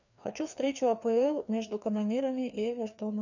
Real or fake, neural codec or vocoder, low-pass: fake; codec, 16 kHz, 2 kbps, FreqCodec, larger model; 7.2 kHz